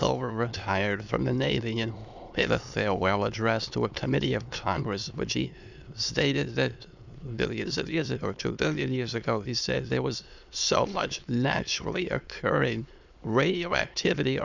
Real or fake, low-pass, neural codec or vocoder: fake; 7.2 kHz; autoencoder, 22.05 kHz, a latent of 192 numbers a frame, VITS, trained on many speakers